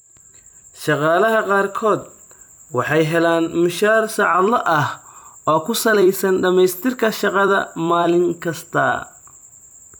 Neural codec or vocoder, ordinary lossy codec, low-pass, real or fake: vocoder, 44.1 kHz, 128 mel bands every 256 samples, BigVGAN v2; none; none; fake